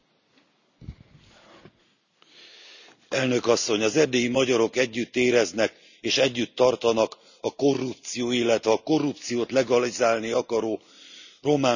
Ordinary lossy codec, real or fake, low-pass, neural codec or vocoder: none; real; 7.2 kHz; none